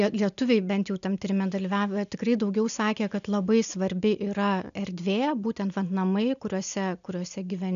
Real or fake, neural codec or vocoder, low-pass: real; none; 7.2 kHz